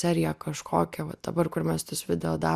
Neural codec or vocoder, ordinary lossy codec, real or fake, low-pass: none; Opus, 64 kbps; real; 14.4 kHz